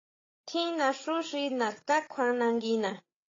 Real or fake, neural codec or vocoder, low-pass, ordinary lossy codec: fake; codec, 16 kHz, 8 kbps, FreqCodec, larger model; 7.2 kHz; AAC, 32 kbps